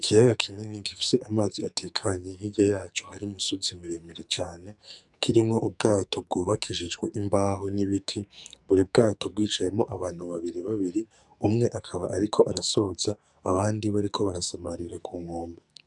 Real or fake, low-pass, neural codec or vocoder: fake; 10.8 kHz; codec, 44.1 kHz, 2.6 kbps, SNAC